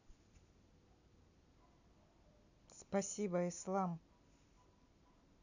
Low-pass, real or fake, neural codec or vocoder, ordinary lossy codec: 7.2 kHz; real; none; none